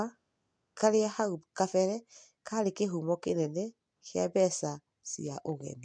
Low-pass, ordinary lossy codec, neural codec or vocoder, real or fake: 9.9 kHz; MP3, 64 kbps; none; real